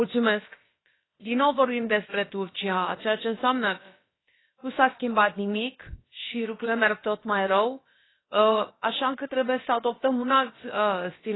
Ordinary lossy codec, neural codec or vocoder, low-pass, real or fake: AAC, 16 kbps; codec, 16 kHz, about 1 kbps, DyCAST, with the encoder's durations; 7.2 kHz; fake